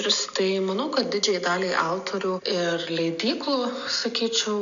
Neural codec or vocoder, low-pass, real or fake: none; 7.2 kHz; real